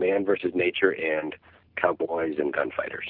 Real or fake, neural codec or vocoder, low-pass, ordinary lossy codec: real; none; 5.4 kHz; Opus, 32 kbps